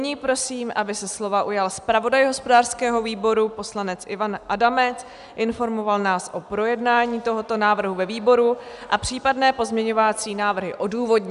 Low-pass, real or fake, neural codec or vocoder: 10.8 kHz; real; none